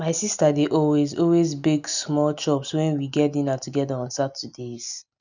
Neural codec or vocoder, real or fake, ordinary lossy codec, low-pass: none; real; none; 7.2 kHz